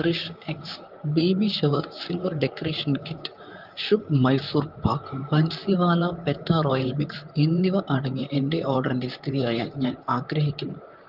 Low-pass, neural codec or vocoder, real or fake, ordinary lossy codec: 5.4 kHz; vocoder, 44.1 kHz, 128 mel bands, Pupu-Vocoder; fake; Opus, 32 kbps